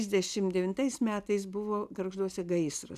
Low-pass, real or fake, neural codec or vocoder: 14.4 kHz; real; none